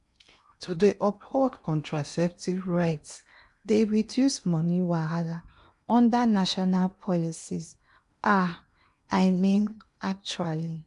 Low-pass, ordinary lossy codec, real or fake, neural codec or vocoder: 10.8 kHz; MP3, 96 kbps; fake; codec, 16 kHz in and 24 kHz out, 0.8 kbps, FocalCodec, streaming, 65536 codes